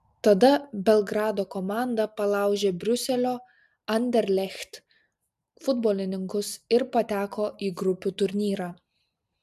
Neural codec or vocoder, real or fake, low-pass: none; real; 14.4 kHz